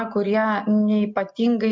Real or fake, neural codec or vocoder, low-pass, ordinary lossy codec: real; none; 7.2 kHz; MP3, 64 kbps